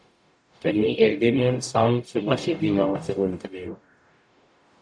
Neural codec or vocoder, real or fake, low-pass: codec, 44.1 kHz, 0.9 kbps, DAC; fake; 9.9 kHz